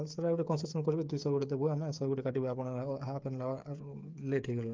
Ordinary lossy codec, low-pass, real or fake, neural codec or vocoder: Opus, 24 kbps; 7.2 kHz; fake; codec, 16 kHz, 8 kbps, FreqCodec, smaller model